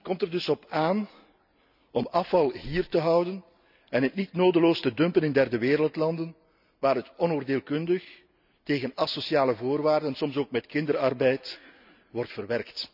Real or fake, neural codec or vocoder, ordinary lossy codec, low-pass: real; none; none; 5.4 kHz